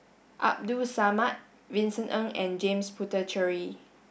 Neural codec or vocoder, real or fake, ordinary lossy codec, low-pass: none; real; none; none